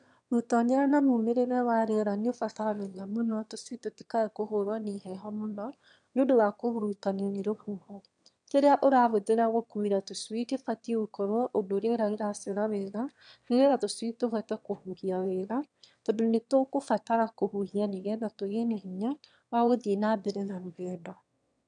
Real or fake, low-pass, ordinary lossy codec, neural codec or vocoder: fake; 9.9 kHz; none; autoencoder, 22.05 kHz, a latent of 192 numbers a frame, VITS, trained on one speaker